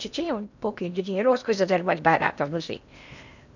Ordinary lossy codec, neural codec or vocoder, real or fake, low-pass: none; codec, 16 kHz in and 24 kHz out, 0.8 kbps, FocalCodec, streaming, 65536 codes; fake; 7.2 kHz